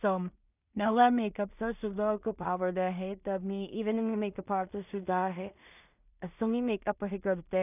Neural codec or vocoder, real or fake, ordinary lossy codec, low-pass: codec, 16 kHz in and 24 kHz out, 0.4 kbps, LongCat-Audio-Codec, two codebook decoder; fake; none; 3.6 kHz